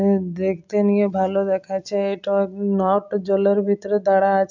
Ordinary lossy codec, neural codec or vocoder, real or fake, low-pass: MP3, 64 kbps; none; real; 7.2 kHz